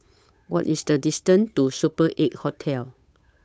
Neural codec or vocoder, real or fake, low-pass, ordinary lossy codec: codec, 16 kHz, 8 kbps, FunCodec, trained on Chinese and English, 25 frames a second; fake; none; none